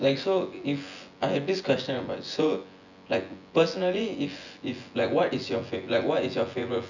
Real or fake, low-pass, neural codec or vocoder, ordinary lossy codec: fake; 7.2 kHz; vocoder, 24 kHz, 100 mel bands, Vocos; none